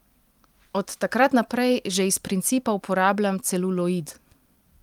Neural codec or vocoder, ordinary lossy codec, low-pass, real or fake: vocoder, 44.1 kHz, 128 mel bands every 256 samples, BigVGAN v2; Opus, 32 kbps; 19.8 kHz; fake